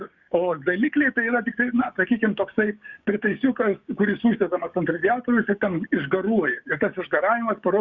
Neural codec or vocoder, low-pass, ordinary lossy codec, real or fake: codec, 16 kHz, 6 kbps, DAC; 7.2 kHz; Opus, 64 kbps; fake